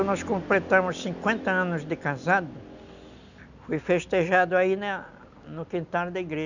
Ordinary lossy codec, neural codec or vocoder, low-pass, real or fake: none; none; 7.2 kHz; real